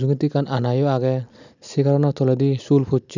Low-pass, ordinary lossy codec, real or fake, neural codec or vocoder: 7.2 kHz; none; real; none